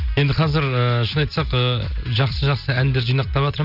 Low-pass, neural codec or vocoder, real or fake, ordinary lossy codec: 5.4 kHz; none; real; none